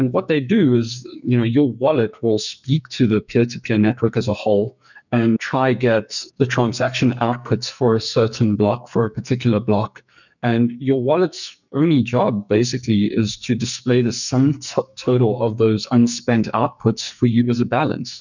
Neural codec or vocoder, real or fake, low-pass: codec, 44.1 kHz, 2.6 kbps, SNAC; fake; 7.2 kHz